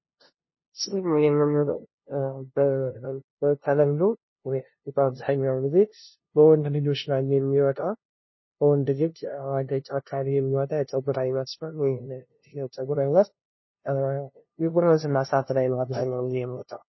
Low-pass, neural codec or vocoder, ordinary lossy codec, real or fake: 7.2 kHz; codec, 16 kHz, 0.5 kbps, FunCodec, trained on LibriTTS, 25 frames a second; MP3, 24 kbps; fake